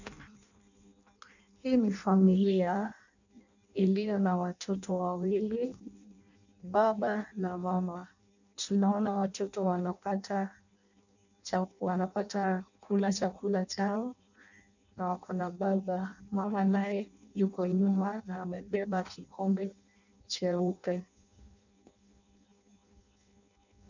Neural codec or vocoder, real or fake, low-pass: codec, 16 kHz in and 24 kHz out, 0.6 kbps, FireRedTTS-2 codec; fake; 7.2 kHz